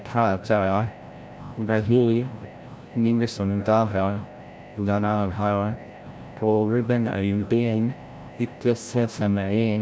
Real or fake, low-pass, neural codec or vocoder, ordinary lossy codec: fake; none; codec, 16 kHz, 0.5 kbps, FreqCodec, larger model; none